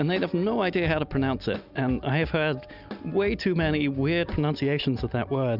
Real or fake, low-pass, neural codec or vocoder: real; 5.4 kHz; none